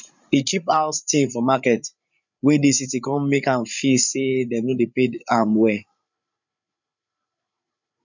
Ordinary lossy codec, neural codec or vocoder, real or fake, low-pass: none; codec, 16 kHz, 8 kbps, FreqCodec, larger model; fake; 7.2 kHz